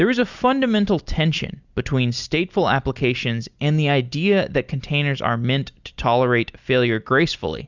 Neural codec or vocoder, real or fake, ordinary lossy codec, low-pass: none; real; Opus, 64 kbps; 7.2 kHz